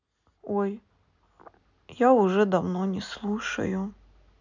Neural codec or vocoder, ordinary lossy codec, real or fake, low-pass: none; none; real; 7.2 kHz